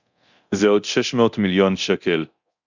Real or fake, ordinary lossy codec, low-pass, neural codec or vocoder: fake; Opus, 64 kbps; 7.2 kHz; codec, 24 kHz, 0.9 kbps, DualCodec